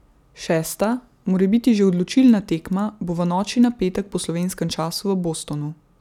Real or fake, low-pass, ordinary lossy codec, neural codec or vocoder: real; 19.8 kHz; none; none